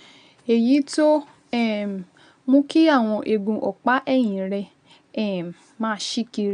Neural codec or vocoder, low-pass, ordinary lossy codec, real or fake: none; 9.9 kHz; none; real